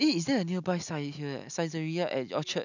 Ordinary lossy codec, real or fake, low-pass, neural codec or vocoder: none; real; 7.2 kHz; none